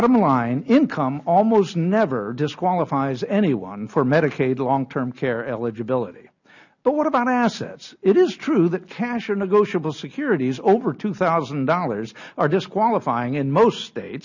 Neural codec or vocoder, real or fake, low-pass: none; real; 7.2 kHz